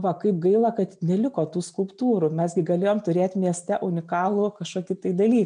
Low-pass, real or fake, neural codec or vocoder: 9.9 kHz; real; none